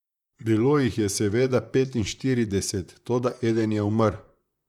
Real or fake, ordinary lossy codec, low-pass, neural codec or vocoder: fake; none; 19.8 kHz; vocoder, 44.1 kHz, 128 mel bands, Pupu-Vocoder